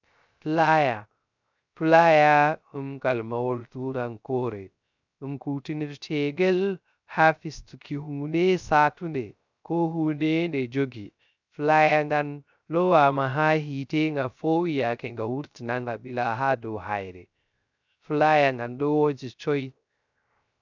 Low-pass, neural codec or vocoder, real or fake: 7.2 kHz; codec, 16 kHz, 0.3 kbps, FocalCodec; fake